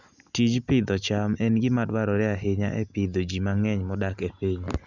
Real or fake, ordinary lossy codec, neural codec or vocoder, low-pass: real; none; none; 7.2 kHz